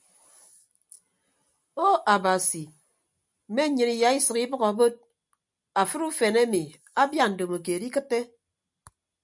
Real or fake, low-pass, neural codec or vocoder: real; 10.8 kHz; none